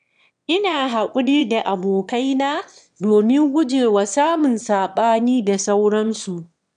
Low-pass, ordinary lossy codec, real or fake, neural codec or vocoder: 9.9 kHz; none; fake; autoencoder, 22.05 kHz, a latent of 192 numbers a frame, VITS, trained on one speaker